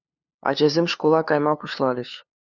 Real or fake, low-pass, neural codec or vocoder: fake; 7.2 kHz; codec, 16 kHz, 2 kbps, FunCodec, trained on LibriTTS, 25 frames a second